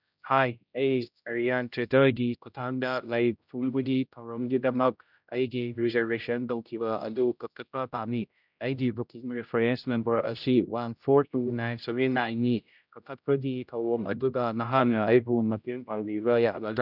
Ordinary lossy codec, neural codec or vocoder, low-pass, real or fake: none; codec, 16 kHz, 0.5 kbps, X-Codec, HuBERT features, trained on general audio; 5.4 kHz; fake